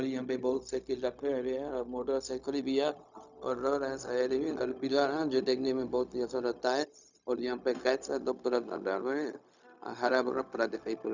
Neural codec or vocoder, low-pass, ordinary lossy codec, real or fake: codec, 16 kHz, 0.4 kbps, LongCat-Audio-Codec; 7.2 kHz; none; fake